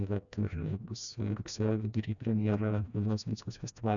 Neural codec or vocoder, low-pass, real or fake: codec, 16 kHz, 1 kbps, FreqCodec, smaller model; 7.2 kHz; fake